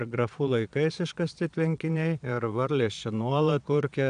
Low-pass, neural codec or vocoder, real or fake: 9.9 kHz; vocoder, 22.05 kHz, 80 mel bands, Vocos; fake